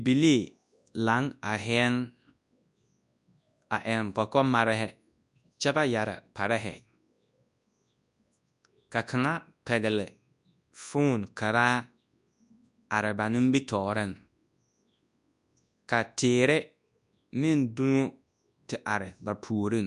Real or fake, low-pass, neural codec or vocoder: fake; 10.8 kHz; codec, 24 kHz, 0.9 kbps, WavTokenizer, large speech release